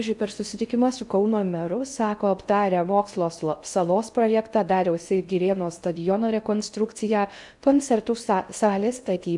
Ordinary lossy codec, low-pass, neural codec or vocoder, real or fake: MP3, 96 kbps; 10.8 kHz; codec, 16 kHz in and 24 kHz out, 0.6 kbps, FocalCodec, streaming, 2048 codes; fake